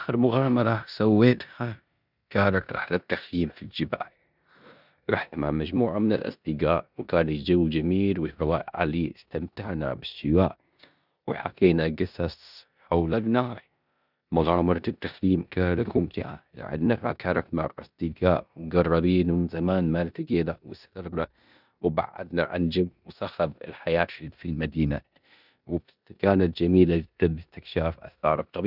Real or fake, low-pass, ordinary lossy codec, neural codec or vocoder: fake; 5.4 kHz; none; codec, 16 kHz in and 24 kHz out, 0.9 kbps, LongCat-Audio-Codec, four codebook decoder